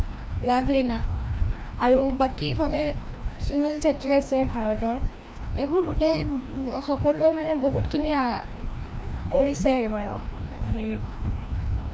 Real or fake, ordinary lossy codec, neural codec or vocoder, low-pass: fake; none; codec, 16 kHz, 1 kbps, FreqCodec, larger model; none